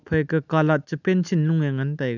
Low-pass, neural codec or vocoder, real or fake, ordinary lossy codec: 7.2 kHz; none; real; none